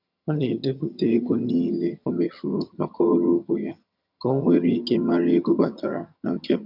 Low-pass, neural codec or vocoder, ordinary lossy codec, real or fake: 5.4 kHz; vocoder, 22.05 kHz, 80 mel bands, HiFi-GAN; none; fake